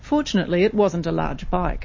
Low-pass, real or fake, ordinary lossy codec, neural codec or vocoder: 7.2 kHz; real; MP3, 32 kbps; none